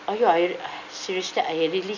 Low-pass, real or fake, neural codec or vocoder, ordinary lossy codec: 7.2 kHz; real; none; none